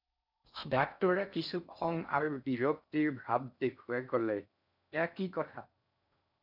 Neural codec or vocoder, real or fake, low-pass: codec, 16 kHz in and 24 kHz out, 0.6 kbps, FocalCodec, streaming, 4096 codes; fake; 5.4 kHz